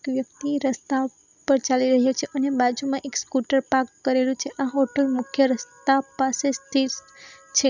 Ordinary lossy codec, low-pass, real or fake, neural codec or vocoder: none; 7.2 kHz; real; none